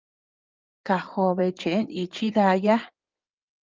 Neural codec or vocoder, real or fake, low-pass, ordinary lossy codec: codec, 16 kHz in and 24 kHz out, 2.2 kbps, FireRedTTS-2 codec; fake; 7.2 kHz; Opus, 32 kbps